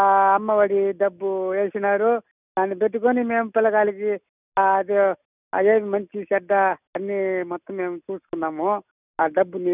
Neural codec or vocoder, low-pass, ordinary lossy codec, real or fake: none; 3.6 kHz; none; real